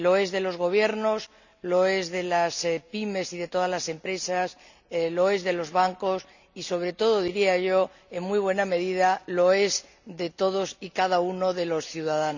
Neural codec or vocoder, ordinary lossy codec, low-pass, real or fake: none; none; 7.2 kHz; real